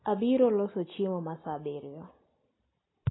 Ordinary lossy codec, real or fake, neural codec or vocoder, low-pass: AAC, 16 kbps; real; none; 7.2 kHz